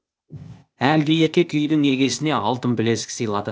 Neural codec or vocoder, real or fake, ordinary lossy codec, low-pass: codec, 16 kHz, 0.8 kbps, ZipCodec; fake; none; none